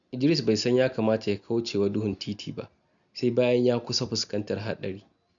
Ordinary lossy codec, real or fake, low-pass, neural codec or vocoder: MP3, 96 kbps; real; 7.2 kHz; none